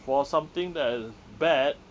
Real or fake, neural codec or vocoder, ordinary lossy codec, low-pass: real; none; none; none